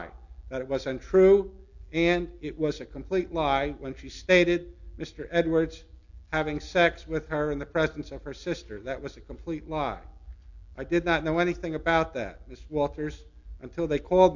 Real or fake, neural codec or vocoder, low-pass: real; none; 7.2 kHz